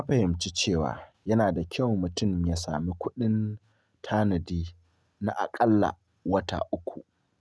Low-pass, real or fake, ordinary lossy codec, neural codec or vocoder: none; real; none; none